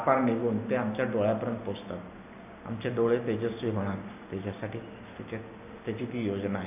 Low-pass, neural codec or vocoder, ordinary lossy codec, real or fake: 3.6 kHz; none; AAC, 32 kbps; real